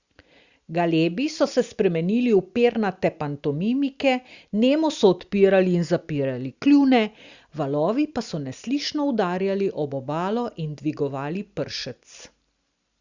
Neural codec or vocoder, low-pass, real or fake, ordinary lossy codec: none; 7.2 kHz; real; Opus, 64 kbps